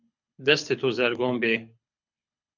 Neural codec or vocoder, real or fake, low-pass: codec, 24 kHz, 6 kbps, HILCodec; fake; 7.2 kHz